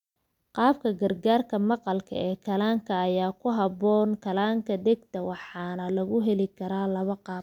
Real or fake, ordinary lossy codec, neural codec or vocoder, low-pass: real; none; none; 19.8 kHz